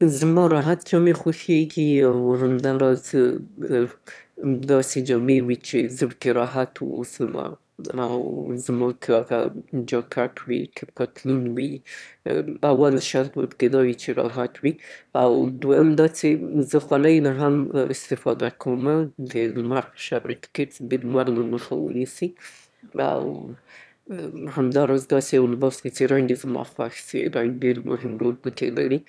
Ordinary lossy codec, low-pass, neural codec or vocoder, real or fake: none; none; autoencoder, 22.05 kHz, a latent of 192 numbers a frame, VITS, trained on one speaker; fake